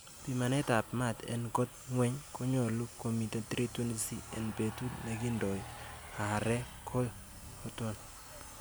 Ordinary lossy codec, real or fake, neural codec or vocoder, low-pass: none; real; none; none